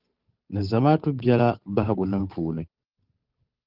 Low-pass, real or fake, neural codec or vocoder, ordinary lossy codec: 5.4 kHz; fake; codec, 16 kHz, 8 kbps, FunCodec, trained on Chinese and English, 25 frames a second; Opus, 32 kbps